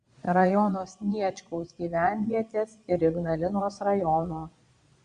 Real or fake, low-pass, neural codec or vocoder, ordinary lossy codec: fake; 9.9 kHz; vocoder, 22.05 kHz, 80 mel bands, Vocos; Opus, 64 kbps